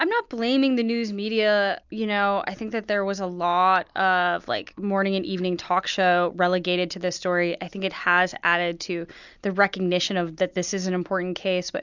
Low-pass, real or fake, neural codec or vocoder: 7.2 kHz; real; none